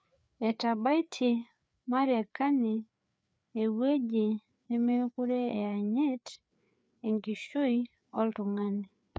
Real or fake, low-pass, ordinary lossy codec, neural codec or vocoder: fake; none; none; codec, 16 kHz, 4 kbps, FreqCodec, larger model